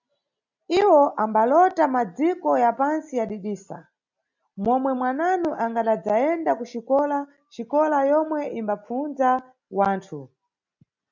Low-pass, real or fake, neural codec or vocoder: 7.2 kHz; real; none